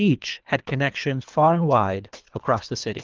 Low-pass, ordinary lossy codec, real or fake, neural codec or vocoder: 7.2 kHz; Opus, 32 kbps; fake; codec, 24 kHz, 3 kbps, HILCodec